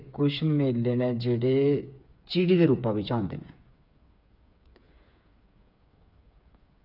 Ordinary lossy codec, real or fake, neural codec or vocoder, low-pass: none; fake; codec, 16 kHz, 8 kbps, FreqCodec, smaller model; 5.4 kHz